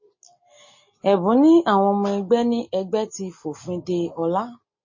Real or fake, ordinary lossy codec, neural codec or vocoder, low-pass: real; MP3, 32 kbps; none; 7.2 kHz